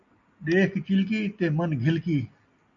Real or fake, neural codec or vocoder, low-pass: real; none; 7.2 kHz